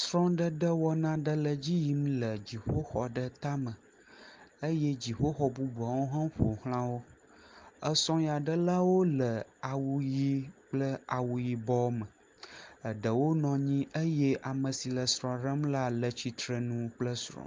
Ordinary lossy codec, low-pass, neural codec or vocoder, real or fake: Opus, 32 kbps; 7.2 kHz; none; real